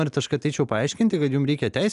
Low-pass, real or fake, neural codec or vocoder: 10.8 kHz; real; none